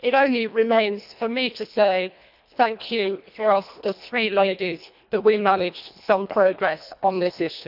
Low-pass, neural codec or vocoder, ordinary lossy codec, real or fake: 5.4 kHz; codec, 24 kHz, 1.5 kbps, HILCodec; none; fake